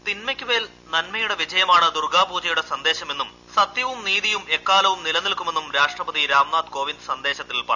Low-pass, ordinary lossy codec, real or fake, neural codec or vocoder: 7.2 kHz; none; real; none